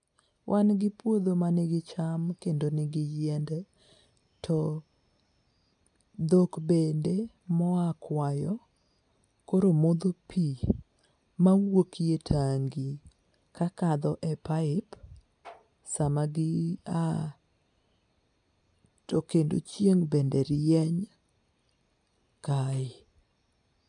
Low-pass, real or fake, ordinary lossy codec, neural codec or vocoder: 9.9 kHz; real; none; none